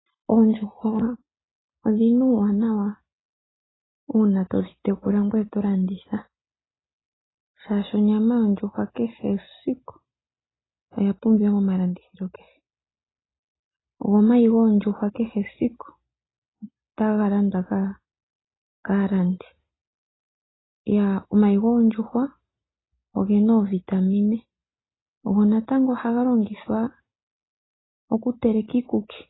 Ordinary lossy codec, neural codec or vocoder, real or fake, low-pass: AAC, 16 kbps; none; real; 7.2 kHz